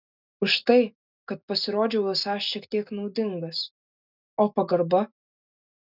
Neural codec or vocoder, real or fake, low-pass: none; real; 5.4 kHz